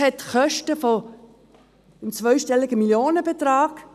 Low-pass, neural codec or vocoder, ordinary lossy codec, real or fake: 14.4 kHz; none; none; real